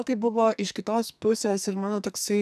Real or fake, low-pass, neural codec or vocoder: fake; 14.4 kHz; codec, 44.1 kHz, 2.6 kbps, SNAC